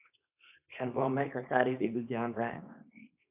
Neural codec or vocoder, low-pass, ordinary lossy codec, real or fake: codec, 24 kHz, 0.9 kbps, WavTokenizer, small release; 3.6 kHz; AAC, 32 kbps; fake